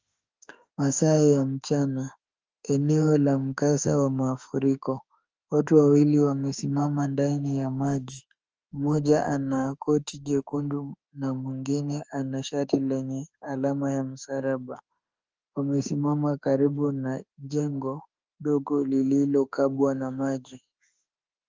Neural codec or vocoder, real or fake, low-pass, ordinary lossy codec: autoencoder, 48 kHz, 32 numbers a frame, DAC-VAE, trained on Japanese speech; fake; 7.2 kHz; Opus, 24 kbps